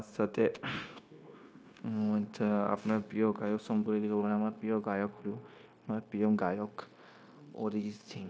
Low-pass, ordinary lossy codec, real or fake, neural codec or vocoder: none; none; fake; codec, 16 kHz, 0.9 kbps, LongCat-Audio-Codec